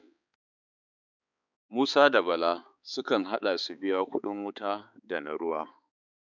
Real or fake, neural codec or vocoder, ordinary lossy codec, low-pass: fake; codec, 16 kHz, 4 kbps, X-Codec, HuBERT features, trained on balanced general audio; none; 7.2 kHz